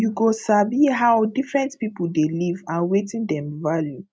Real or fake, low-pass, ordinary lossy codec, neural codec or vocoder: real; none; none; none